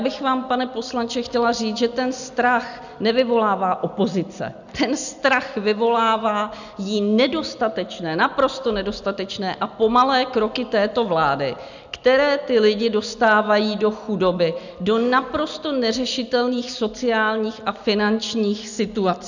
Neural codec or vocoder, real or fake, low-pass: none; real; 7.2 kHz